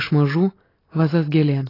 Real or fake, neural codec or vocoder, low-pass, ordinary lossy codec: real; none; 5.4 kHz; AAC, 24 kbps